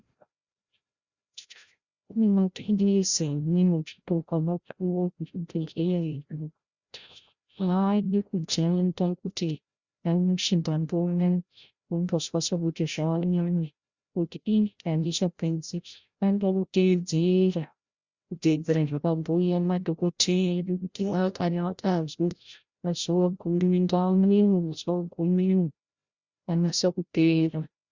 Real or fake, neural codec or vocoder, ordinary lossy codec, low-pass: fake; codec, 16 kHz, 0.5 kbps, FreqCodec, larger model; Opus, 64 kbps; 7.2 kHz